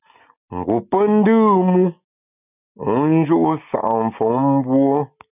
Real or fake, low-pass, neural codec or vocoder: real; 3.6 kHz; none